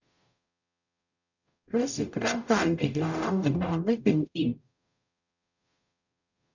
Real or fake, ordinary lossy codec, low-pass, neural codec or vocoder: fake; none; 7.2 kHz; codec, 44.1 kHz, 0.9 kbps, DAC